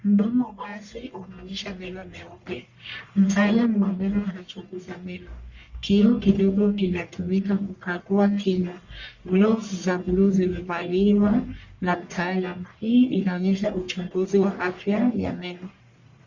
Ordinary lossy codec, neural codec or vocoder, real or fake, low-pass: Opus, 64 kbps; codec, 44.1 kHz, 1.7 kbps, Pupu-Codec; fake; 7.2 kHz